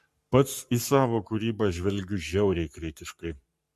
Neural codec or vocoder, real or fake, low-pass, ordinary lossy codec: codec, 44.1 kHz, 7.8 kbps, Pupu-Codec; fake; 14.4 kHz; MP3, 64 kbps